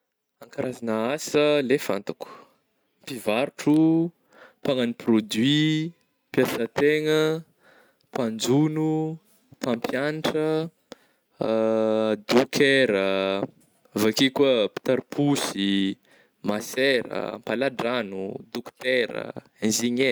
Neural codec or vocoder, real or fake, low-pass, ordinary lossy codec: none; real; none; none